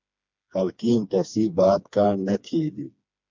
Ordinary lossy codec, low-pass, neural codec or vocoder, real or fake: MP3, 64 kbps; 7.2 kHz; codec, 16 kHz, 2 kbps, FreqCodec, smaller model; fake